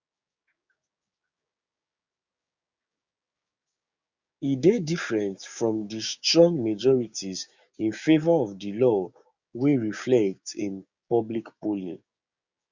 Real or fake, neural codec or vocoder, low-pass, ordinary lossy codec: fake; codec, 16 kHz, 6 kbps, DAC; 7.2 kHz; Opus, 64 kbps